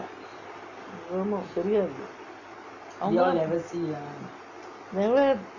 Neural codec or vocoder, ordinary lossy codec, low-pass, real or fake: none; none; 7.2 kHz; real